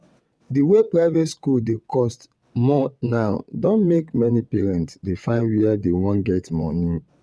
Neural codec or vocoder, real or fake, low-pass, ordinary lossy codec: vocoder, 22.05 kHz, 80 mel bands, WaveNeXt; fake; none; none